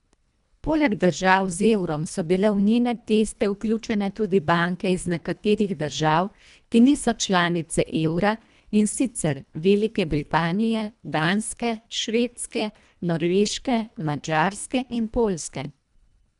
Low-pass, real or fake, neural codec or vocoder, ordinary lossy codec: 10.8 kHz; fake; codec, 24 kHz, 1.5 kbps, HILCodec; none